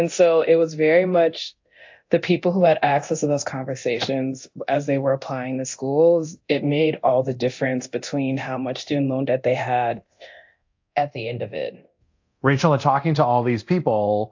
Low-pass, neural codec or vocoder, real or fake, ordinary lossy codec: 7.2 kHz; codec, 24 kHz, 0.9 kbps, DualCodec; fake; AAC, 48 kbps